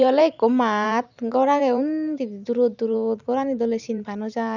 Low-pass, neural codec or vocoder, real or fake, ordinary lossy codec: 7.2 kHz; vocoder, 44.1 kHz, 128 mel bands every 512 samples, BigVGAN v2; fake; none